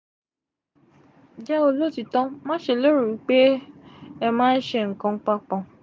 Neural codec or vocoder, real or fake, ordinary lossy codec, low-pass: none; real; none; none